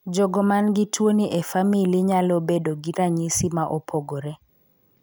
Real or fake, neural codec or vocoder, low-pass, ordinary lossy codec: real; none; none; none